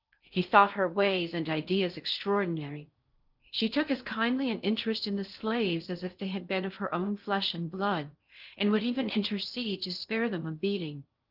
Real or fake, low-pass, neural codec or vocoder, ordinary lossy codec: fake; 5.4 kHz; codec, 16 kHz in and 24 kHz out, 0.6 kbps, FocalCodec, streaming, 4096 codes; Opus, 24 kbps